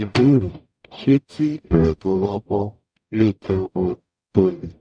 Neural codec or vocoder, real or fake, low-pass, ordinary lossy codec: codec, 44.1 kHz, 0.9 kbps, DAC; fake; 9.9 kHz; none